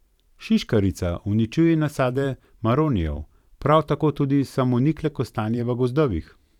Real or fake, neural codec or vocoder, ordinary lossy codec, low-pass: fake; vocoder, 44.1 kHz, 128 mel bands every 512 samples, BigVGAN v2; none; 19.8 kHz